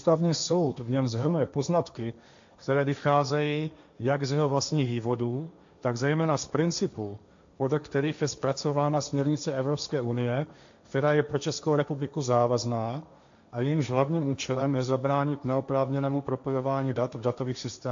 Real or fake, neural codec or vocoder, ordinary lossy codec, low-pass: fake; codec, 16 kHz, 1.1 kbps, Voila-Tokenizer; AAC, 64 kbps; 7.2 kHz